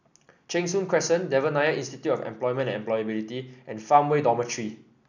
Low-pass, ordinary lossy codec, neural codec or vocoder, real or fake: 7.2 kHz; none; none; real